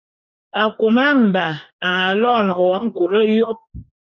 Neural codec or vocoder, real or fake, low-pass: codec, 44.1 kHz, 2.6 kbps, DAC; fake; 7.2 kHz